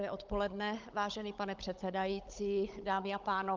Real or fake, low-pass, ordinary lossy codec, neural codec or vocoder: fake; 7.2 kHz; Opus, 32 kbps; codec, 16 kHz, 16 kbps, FunCodec, trained on Chinese and English, 50 frames a second